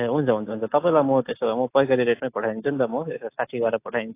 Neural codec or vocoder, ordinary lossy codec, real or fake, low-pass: none; AAC, 24 kbps; real; 3.6 kHz